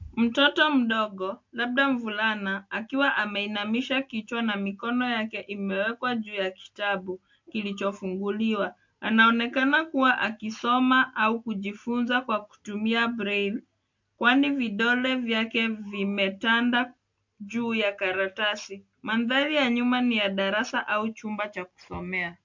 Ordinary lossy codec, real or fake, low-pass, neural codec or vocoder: MP3, 48 kbps; real; 7.2 kHz; none